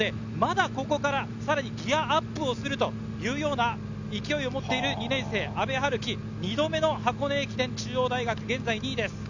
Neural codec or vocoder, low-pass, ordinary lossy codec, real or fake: none; 7.2 kHz; none; real